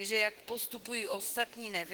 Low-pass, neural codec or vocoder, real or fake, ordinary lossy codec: 19.8 kHz; autoencoder, 48 kHz, 32 numbers a frame, DAC-VAE, trained on Japanese speech; fake; Opus, 16 kbps